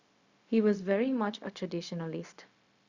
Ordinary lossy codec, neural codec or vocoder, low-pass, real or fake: Opus, 64 kbps; codec, 16 kHz, 0.4 kbps, LongCat-Audio-Codec; 7.2 kHz; fake